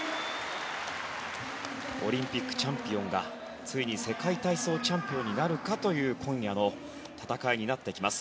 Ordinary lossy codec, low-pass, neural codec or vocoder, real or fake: none; none; none; real